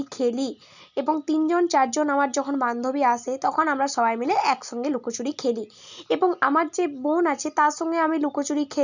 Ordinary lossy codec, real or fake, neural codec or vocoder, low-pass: none; real; none; 7.2 kHz